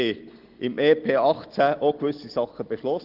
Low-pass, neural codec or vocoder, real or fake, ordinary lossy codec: 5.4 kHz; none; real; Opus, 16 kbps